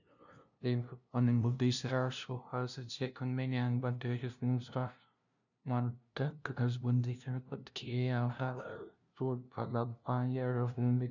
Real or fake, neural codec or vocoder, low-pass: fake; codec, 16 kHz, 0.5 kbps, FunCodec, trained on LibriTTS, 25 frames a second; 7.2 kHz